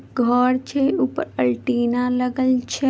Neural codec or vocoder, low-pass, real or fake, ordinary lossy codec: none; none; real; none